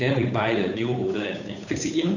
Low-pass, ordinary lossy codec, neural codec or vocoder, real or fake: 7.2 kHz; none; codec, 16 kHz, 8 kbps, FunCodec, trained on Chinese and English, 25 frames a second; fake